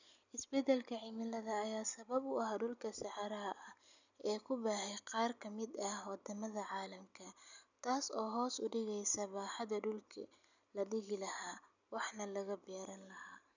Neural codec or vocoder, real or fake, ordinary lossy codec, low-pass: none; real; none; 7.2 kHz